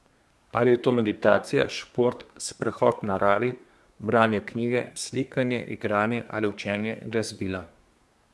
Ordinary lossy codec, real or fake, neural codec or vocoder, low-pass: none; fake; codec, 24 kHz, 1 kbps, SNAC; none